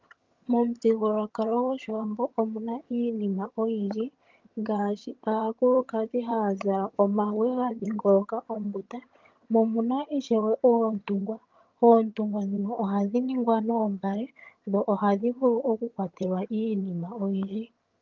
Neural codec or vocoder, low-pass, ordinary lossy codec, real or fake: vocoder, 22.05 kHz, 80 mel bands, HiFi-GAN; 7.2 kHz; Opus, 32 kbps; fake